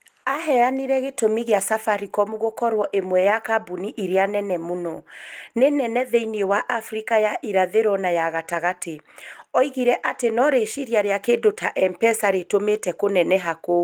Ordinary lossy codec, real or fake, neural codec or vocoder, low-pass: Opus, 24 kbps; real; none; 19.8 kHz